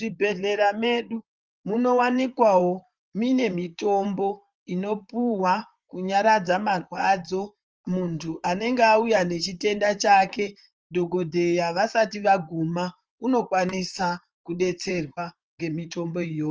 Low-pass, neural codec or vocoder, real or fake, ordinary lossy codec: 7.2 kHz; vocoder, 44.1 kHz, 128 mel bands, Pupu-Vocoder; fake; Opus, 24 kbps